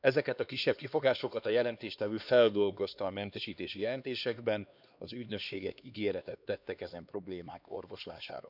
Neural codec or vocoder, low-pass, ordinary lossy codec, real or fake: codec, 16 kHz, 2 kbps, X-Codec, HuBERT features, trained on LibriSpeech; 5.4 kHz; none; fake